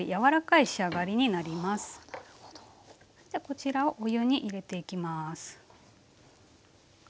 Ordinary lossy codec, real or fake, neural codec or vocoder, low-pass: none; real; none; none